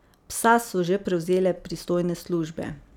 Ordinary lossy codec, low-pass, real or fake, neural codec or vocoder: none; 19.8 kHz; real; none